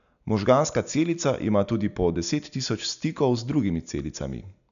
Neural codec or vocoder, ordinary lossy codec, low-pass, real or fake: none; none; 7.2 kHz; real